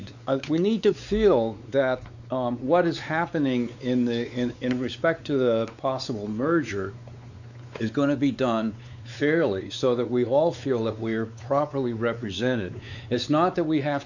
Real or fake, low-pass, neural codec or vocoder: fake; 7.2 kHz; codec, 16 kHz, 4 kbps, X-Codec, WavLM features, trained on Multilingual LibriSpeech